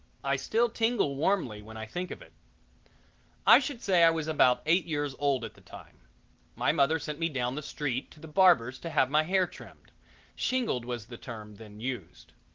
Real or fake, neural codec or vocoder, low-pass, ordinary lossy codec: real; none; 7.2 kHz; Opus, 16 kbps